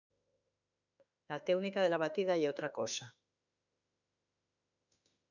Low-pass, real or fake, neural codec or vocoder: 7.2 kHz; fake; autoencoder, 48 kHz, 32 numbers a frame, DAC-VAE, trained on Japanese speech